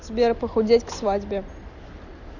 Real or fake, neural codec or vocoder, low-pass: real; none; 7.2 kHz